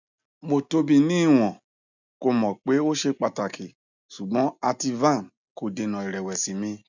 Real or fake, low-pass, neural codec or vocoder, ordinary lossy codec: real; 7.2 kHz; none; none